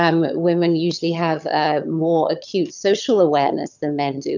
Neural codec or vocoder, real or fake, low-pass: vocoder, 22.05 kHz, 80 mel bands, HiFi-GAN; fake; 7.2 kHz